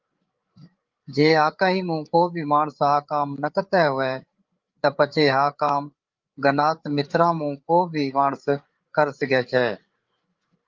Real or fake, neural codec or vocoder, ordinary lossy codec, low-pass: fake; codec, 16 kHz, 8 kbps, FreqCodec, larger model; Opus, 32 kbps; 7.2 kHz